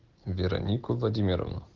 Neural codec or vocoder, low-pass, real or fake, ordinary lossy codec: none; 7.2 kHz; real; Opus, 16 kbps